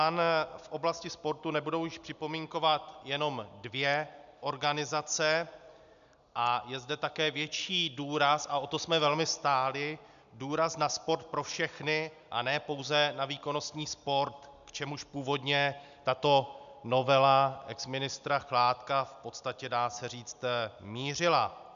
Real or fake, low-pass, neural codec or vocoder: real; 7.2 kHz; none